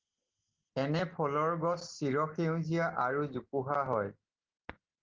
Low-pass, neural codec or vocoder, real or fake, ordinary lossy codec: 7.2 kHz; none; real; Opus, 16 kbps